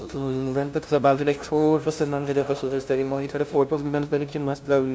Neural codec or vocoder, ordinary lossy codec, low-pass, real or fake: codec, 16 kHz, 0.5 kbps, FunCodec, trained on LibriTTS, 25 frames a second; none; none; fake